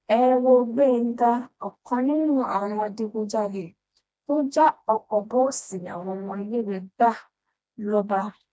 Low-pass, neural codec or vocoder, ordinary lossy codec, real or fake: none; codec, 16 kHz, 1 kbps, FreqCodec, smaller model; none; fake